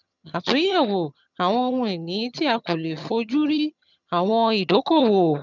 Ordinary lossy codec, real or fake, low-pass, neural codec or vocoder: none; fake; 7.2 kHz; vocoder, 22.05 kHz, 80 mel bands, HiFi-GAN